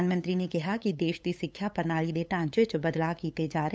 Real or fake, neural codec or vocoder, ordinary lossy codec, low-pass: fake; codec, 16 kHz, 8 kbps, FunCodec, trained on LibriTTS, 25 frames a second; none; none